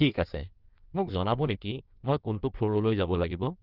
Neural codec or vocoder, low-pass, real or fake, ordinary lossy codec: codec, 16 kHz in and 24 kHz out, 1.1 kbps, FireRedTTS-2 codec; 5.4 kHz; fake; Opus, 24 kbps